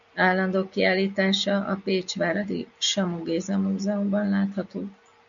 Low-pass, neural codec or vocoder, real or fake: 7.2 kHz; none; real